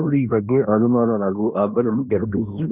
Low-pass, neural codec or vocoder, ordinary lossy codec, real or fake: 3.6 kHz; codec, 16 kHz in and 24 kHz out, 0.9 kbps, LongCat-Audio-Codec, fine tuned four codebook decoder; none; fake